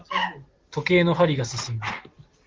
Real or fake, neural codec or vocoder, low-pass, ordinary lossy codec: real; none; 7.2 kHz; Opus, 16 kbps